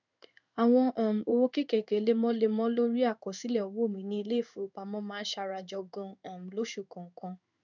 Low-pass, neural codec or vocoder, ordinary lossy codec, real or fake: 7.2 kHz; codec, 16 kHz in and 24 kHz out, 1 kbps, XY-Tokenizer; none; fake